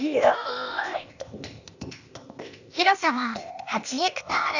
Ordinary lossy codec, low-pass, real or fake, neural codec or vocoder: none; 7.2 kHz; fake; codec, 16 kHz, 0.8 kbps, ZipCodec